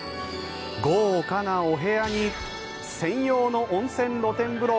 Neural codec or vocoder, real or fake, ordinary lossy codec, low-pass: none; real; none; none